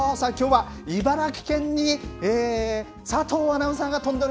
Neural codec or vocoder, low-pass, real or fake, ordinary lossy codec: none; none; real; none